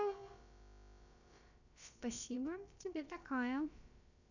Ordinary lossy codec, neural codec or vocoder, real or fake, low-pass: none; codec, 16 kHz, about 1 kbps, DyCAST, with the encoder's durations; fake; 7.2 kHz